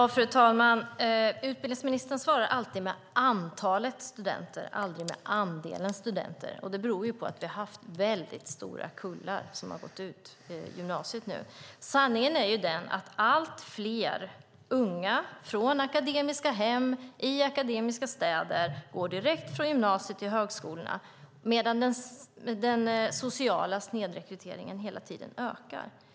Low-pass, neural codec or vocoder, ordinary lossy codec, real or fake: none; none; none; real